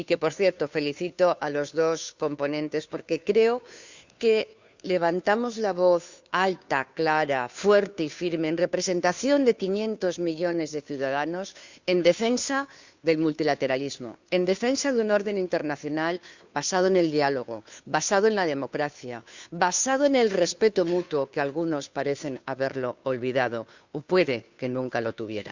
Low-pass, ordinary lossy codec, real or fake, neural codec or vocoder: 7.2 kHz; Opus, 64 kbps; fake; codec, 16 kHz, 2 kbps, FunCodec, trained on Chinese and English, 25 frames a second